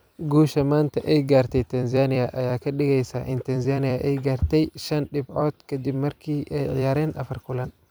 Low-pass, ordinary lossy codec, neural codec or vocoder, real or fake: none; none; vocoder, 44.1 kHz, 128 mel bands every 256 samples, BigVGAN v2; fake